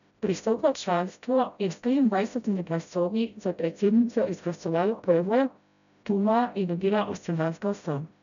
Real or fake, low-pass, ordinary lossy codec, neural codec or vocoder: fake; 7.2 kHz; none; codec, 16 kHz, 0.5 kbps, FreqCodec, smaller model